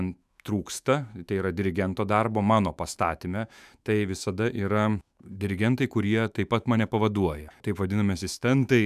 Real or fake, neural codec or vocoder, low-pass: fake; autoencoder, 48 kHz, 128 numbers a frame, DAC-VAE, trained on Japanese speech; 14.4 kHz